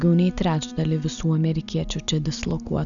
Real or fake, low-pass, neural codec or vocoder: real; 7.2 kHz; none